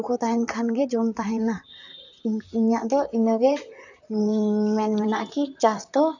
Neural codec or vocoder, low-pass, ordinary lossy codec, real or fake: vocoder, 44.1 kHz, 128 mel bands, Pupu-Vocoder; 7.2 kHz; none; fake